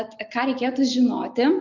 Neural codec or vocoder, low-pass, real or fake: none; 7.2 kHz; real